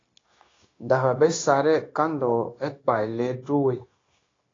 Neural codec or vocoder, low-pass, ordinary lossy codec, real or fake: codec, 16 kHz, 0.9 kbps, LongCat-Audio-Codec; 7.2 kHz; AAC, 32 kbps; fake